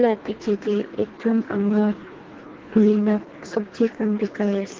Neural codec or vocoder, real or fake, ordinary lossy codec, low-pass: codec, 24 kHz, 1.5 kbps, HILCodec; fake; Opus, 16 kbps; 7.2 kHz